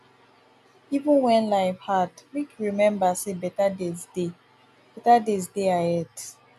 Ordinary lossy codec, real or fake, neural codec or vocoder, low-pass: none; real; none; 14.4 kHz